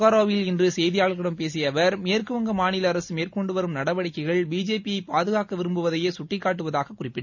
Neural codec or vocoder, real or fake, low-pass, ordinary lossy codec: none; real; 7.2 kHz; none